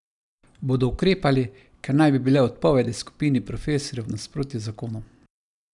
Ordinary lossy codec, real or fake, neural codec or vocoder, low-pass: none; real; none; 10.8 kHz